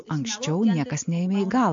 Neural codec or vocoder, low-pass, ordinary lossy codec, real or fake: none; 7.2 kHz; MP3, 48 kbps; real